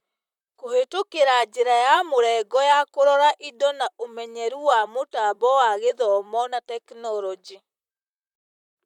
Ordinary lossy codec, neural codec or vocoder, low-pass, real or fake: none; vocoder, 44.1 kHz, 128 mel bands, Pupu-Vocoder; 19.8 kHz; fake